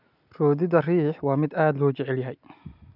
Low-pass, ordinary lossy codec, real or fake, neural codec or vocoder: 5.4 kHz; none; real; none